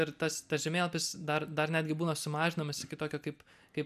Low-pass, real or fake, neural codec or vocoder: 14.4 kHz; real; none